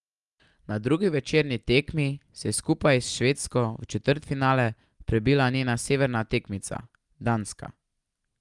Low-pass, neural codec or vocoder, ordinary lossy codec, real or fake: 10.8 kHz; none; Opus, 32 kbps; real